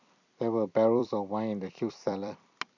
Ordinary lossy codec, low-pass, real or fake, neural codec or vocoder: none; 7.2 kHz; fake; vocoder, 44.1 kHz, 128 mel bands every 256 samples, BigVGAN v2